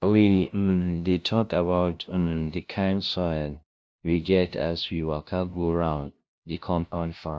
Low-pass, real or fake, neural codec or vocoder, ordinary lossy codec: none; fake; codec, 16 kHz, 0.5 kbps, FunCodec, trained on LibriTTS, 25 frames a second; none